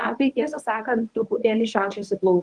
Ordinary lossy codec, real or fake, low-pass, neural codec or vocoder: Opus, 24 kbps; fake; 10.8 kHz; codec, 24 kHz, 0.9 kbps, WavTokenizer, medium speech release version 1